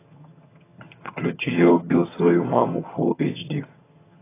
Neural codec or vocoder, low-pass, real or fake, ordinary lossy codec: vocoder, 22.05 kHz, 80 mel bands, HiFi-GAN; 3.6 kHz; fake; AAC, 16 kbps